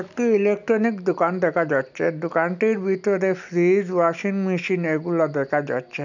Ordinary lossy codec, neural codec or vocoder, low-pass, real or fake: none; none; 7.2 kHz; real